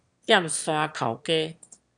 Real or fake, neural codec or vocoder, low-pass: fake; autoencoder, 22.05 kHz, a latent of 192 numbers a frame, VITS, trained on one speaker; 9.9 kHz